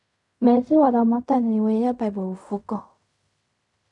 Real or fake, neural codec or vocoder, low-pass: fake; codec, 16 kHz in and 24 kHz out, 0.4 kbps, LongCat-Audio-Codec, fine tuned four codebook decoder; 10.8 kHz